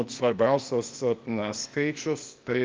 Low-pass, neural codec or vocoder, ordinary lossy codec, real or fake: 7.2 kHz; codec, 16 kHz, 0.8 kbps, ZipCodec; Opus, 24 kbps; fake